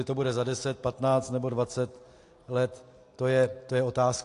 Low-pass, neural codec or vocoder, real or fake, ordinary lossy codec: 10.8 kHz; none; real; AAC, 48 kbps